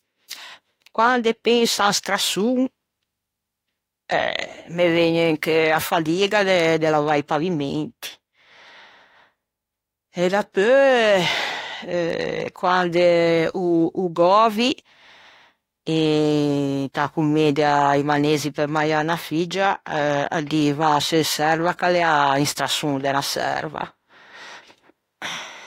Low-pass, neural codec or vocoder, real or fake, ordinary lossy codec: 19.8 kHz; autoencoder, 48 kHz, 32 numbers a frame, DAC-VAE, trained on Japanese speech; fake; AAC, 48 kbps